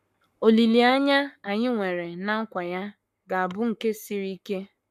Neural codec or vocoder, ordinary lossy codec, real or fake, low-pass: codec, 44.1 kHz, 7.8 kbps, Pupu-Codec; none; fake; 14.4 kHz